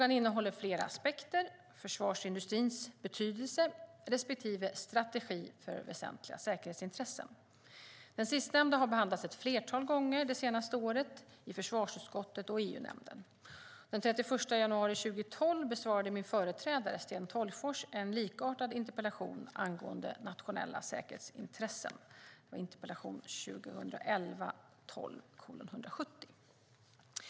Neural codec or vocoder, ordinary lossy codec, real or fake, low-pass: none; none; real; none